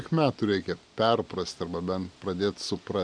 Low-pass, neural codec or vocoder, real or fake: 9.9 kHz; none; real